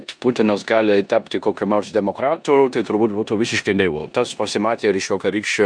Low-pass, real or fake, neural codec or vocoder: 9.9 kHz; fake; codec, 16 kHz in and 24 kHz out, 0.9 kbps, LongCat-Audio-Codec, four codebook decoder